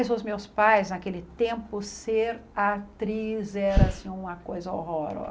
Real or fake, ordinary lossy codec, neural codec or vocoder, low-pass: real; none; none; none